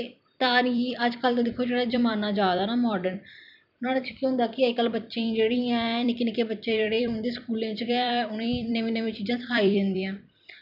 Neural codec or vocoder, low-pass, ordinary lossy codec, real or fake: vocoder, 44.1 kHz, 128 mel bands every 256 samples, BigVGAN v2; 5.4 kHz; none; fake